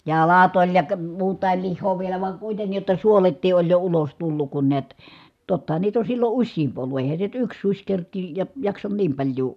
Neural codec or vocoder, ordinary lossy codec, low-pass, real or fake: none; none; 14.4 kHz; real